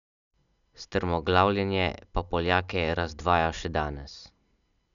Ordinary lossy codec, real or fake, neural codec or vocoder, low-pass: none; real; none; 7.2 kHz